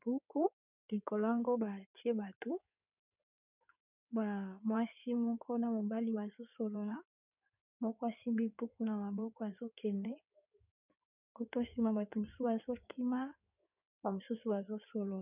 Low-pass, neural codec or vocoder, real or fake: 3.6 kHz; codec, 16 kHz in and 24 kHz out, 2.2 kbps, FireRedTTS-2 codec; fake